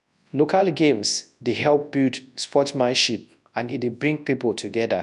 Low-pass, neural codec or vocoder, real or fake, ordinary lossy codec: 10.8 kHz; codec, 24 kHz, 0.9 kbps, WavTokenizer, large speech release; fake; none